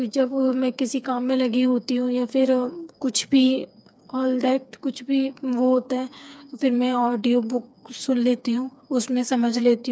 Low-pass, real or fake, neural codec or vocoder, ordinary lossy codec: none; fake; codec, 16 kHz, 4 kbps, FreqCodec, smaller model; none